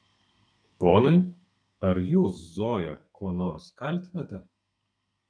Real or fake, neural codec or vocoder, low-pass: fake; codec, 32 kHz, 1.9 kbps, SNAC; 9.9 kHz